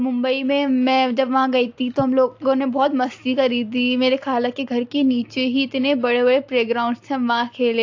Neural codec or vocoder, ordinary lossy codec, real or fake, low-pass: none; AAC, 48 kbps; real; 7.2 kHz